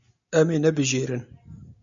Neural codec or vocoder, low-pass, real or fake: none; 7.2 kHz; real